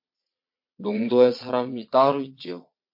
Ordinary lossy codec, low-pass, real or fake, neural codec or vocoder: MP3, 32 kbps; 5.4 kHz; fake; vocoder, 22.05 kHz, 80 mel bands, Vocos